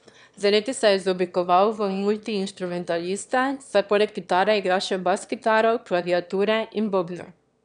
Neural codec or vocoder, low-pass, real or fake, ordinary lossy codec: autoencoder, 22.05 kHz, a latent of 192 numbers a frame, VITS, trained on one speaker; 9.9 kHz; fake; none